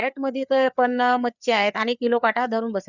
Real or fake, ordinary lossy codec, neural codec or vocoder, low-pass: fake; none; codec, 16 kHz, 4 kbps, FreqCodec, larger model; 7.2 kHz